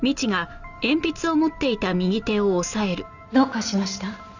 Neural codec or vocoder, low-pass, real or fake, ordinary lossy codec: none; 7.2 kHz; real; none